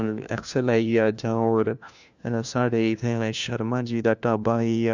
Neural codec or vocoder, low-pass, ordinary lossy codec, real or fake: codec, 16 kHz, 1 kbps, FunCodec, trained on LibriTTS, 50 frames a second; 7.2 kHz; Opus, 64 kbps; fake